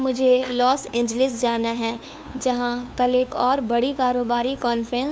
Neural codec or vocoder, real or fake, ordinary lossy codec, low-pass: codec, 16 kHz, 2 kbps, FunCodec, trained on LibriTTS, 25 frames a second; fake; none; none